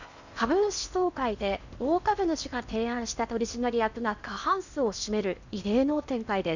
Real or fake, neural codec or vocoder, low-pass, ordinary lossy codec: fake; codec, 16 kHz in and 24 kHz out, 0.8 kbps, FocalCodec, streaming, 65536 codes; 7.2 kHz; none